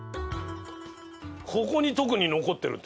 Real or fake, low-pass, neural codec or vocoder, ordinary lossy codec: real; none; none; none